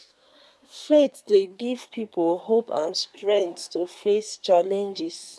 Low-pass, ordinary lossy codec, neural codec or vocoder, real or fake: none; none; codec, 24 kHz, 1 kbps, SNAC; fake